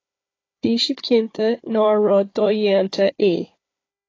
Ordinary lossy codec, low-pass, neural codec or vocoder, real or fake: AAC, 32 kbps; 7.2 kHz; codec, 16 kHz, 4 kbps, FunCodec, trained on Chinese and English, 50 frames a second; fake